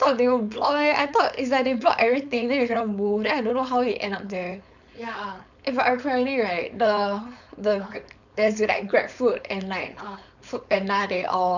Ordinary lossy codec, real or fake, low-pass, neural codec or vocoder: none; fake; 7.2 kHz; codec, 16 kHz, 4.8 kbps, FACodec